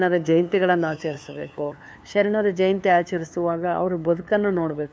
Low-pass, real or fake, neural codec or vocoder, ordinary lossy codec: none; fake; codec, 16 kHz, 2 kbps, FunCodec, trained on LibriTTS, 25 frames a second; none